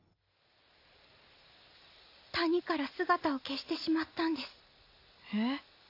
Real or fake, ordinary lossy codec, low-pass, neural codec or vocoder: real; AAC, 32 kbps; 5.4 kHz; none